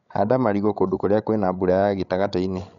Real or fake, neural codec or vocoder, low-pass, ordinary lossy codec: fake; codec, 16 kHz, 16 kbps, FreqCodec, larger model; 7.2 kHz; none